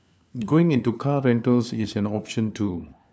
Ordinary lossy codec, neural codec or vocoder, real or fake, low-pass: none; codec, 16 kHz, 4 kbps, FunCodec, trained on LibriTTS, 50 frames a second; fake; none